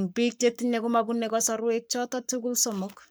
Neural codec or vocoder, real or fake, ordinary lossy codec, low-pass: codec, 44.1 kHz, 7.8 kbps, Pupu-Codec; fake; none; none